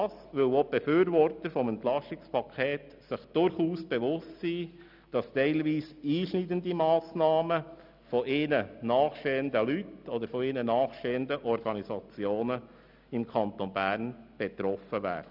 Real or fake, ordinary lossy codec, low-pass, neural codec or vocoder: real; none; 5.4 kHz; none